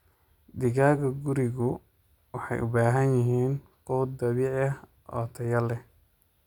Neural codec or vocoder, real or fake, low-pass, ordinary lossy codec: none; real; 19.8 kHz; none